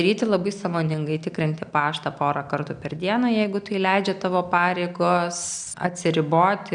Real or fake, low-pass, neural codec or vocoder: real; 9.9 kHz; none